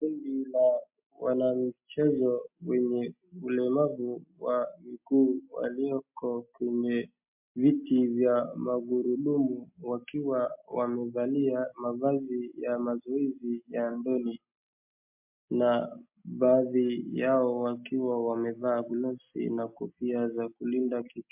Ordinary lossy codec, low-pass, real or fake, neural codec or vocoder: MP3, 32 kbps; 3.6 kHz; real; none